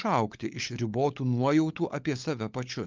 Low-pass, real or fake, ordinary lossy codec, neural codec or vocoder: 7.2 kHz; fake; Opus, 24 kbps; codec, 16 kHz, 16 kbps, FunCodec, trained on LibriTTS, 50 frames a second